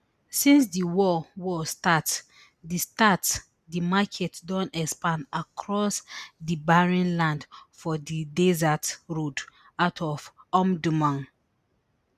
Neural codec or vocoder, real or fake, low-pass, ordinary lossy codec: none; real; 14.4 kHz; none